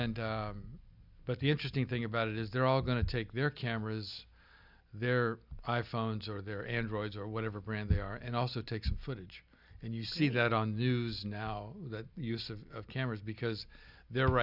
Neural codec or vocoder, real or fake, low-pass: none; real; 5.4 kHz